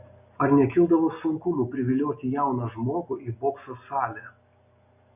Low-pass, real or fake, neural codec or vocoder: 3.6 kHz; real; none